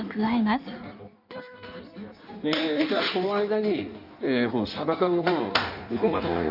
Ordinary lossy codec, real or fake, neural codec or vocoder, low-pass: none; fake; codec, 16 kHz in and 24 kHz out, 1.1 kbps, FireRedTTS-2 codec; 5.4 kHz